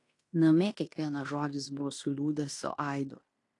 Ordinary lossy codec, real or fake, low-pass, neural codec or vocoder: AAC, 48 kbps; fake; 10.8 kHz; codec, 16 kHz in and 24 kHz out, 0.9 kbps, LongCat-Audio-Codec, fine tuned four codebook decoder